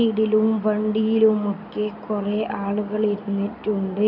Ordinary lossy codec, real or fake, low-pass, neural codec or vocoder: none; real; 5.4 kHz; none